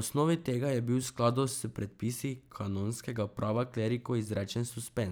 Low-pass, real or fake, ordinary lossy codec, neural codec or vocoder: none; fake; none; vocoder, 44.1 kHz, 128 mel bands every 512 samples, BigVGAN v2